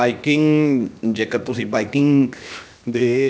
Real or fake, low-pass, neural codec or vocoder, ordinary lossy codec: fake; none; codec, 16 kHz, 0.7 kbps, FocalCodec; none